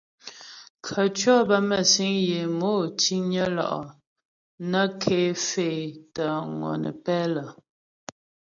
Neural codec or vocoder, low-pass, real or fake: none; 7.2 kHz; real